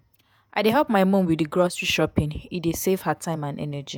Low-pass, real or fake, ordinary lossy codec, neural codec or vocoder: none; fake; none; vocoder, 48 kHz, 128 mel bands, Vocos